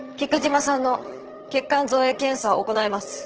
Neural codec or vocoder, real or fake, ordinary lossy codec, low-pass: vocoder, 22.05 kHz, 80 mel bands, HiFi-GAN; fake; Opus, 16 kbps; 7.2 kHz